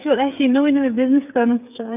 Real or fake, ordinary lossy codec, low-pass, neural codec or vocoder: fake; none; 3.6 kHz; codec, 16 kHz, 8 kbps, FreqCodec, smaller model